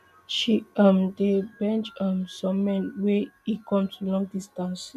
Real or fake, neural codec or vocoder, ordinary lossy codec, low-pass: real; none; none; 14.4 kHz